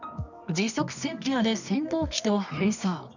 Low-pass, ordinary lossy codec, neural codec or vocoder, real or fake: 7.2 kHz; none; codec, 24 kHz, 0.9 kbps, WavTokenizer, medium music audio release; fake